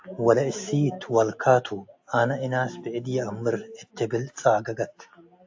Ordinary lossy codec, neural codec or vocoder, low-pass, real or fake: AAC, 48 kbps; none; 7.2 kHz; real